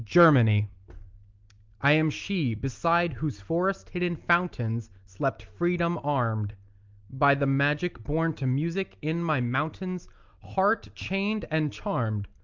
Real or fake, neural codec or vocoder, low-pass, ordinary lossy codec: real; none; 7.2 kHz; Opus, 24 kbps